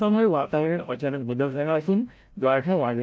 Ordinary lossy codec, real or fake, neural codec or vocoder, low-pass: none; fake; codec, 16 kHz, 0.5 kbps, FreqCodec, larger model; none